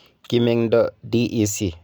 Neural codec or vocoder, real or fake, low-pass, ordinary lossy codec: none; real; none; none